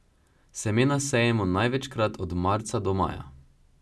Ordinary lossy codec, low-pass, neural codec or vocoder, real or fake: none; none; none; real